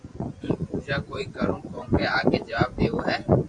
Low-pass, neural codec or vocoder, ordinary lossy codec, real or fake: 10.8 kHz; vocoder, 24 kHz, 100 mel bands, Vocos; AAC, 64 kbps; fake